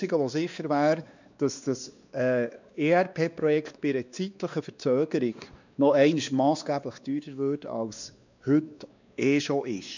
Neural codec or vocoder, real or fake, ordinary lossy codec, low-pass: codec, 16 kHz, 2 kbps, X-Codec, WavLM features, trained on Multilingual LibriSpeech; fake; none; 7.2 kHz